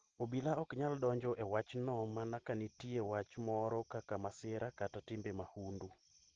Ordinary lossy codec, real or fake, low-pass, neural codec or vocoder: Opus, 16 kbps; real; 7.2 kHz; none